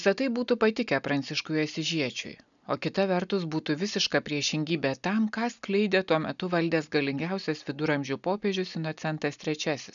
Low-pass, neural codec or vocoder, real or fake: 7.2 kHz; none; real